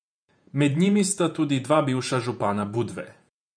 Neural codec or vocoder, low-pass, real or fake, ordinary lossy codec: vocoder, 48 kHz, 128 mel bands, Vocos; 9.9 kHz; fake; none